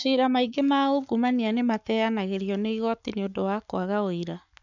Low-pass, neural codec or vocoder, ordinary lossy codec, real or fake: 7.2 kHz; codec, 44.1 kHz, 7.8 kbps, Pupu-Codec; none; fake